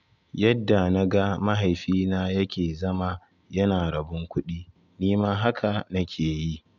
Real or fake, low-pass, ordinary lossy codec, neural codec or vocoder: real; 7.2 kHz; none; none